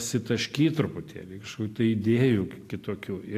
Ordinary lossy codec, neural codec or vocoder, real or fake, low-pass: AAC, 64 kbps; none; real; 14.4 kHz